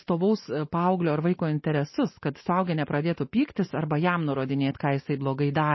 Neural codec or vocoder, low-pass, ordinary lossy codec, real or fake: none; 7.2 kHz; MP3, 24 kbps; real